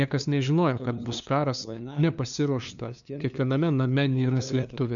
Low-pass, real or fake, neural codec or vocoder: 7.2 kHz; fake; codec, 16 kHz, 2 kbps, FunCodec, trained on LibriTTS, 25 frames a second